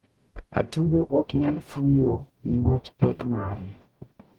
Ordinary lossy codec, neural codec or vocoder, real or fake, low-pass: Opus, 24 kbps; codec, 44.1 kHz, 0.9 kbps, DAC; fake; 19.8 kHz